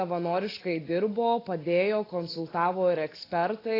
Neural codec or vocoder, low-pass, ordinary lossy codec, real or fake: none; 5.4 kHz; AAC, 24 kbps; real